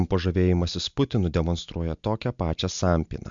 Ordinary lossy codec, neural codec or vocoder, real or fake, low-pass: MP3, 64 kbps; none; real; 7.2 kHz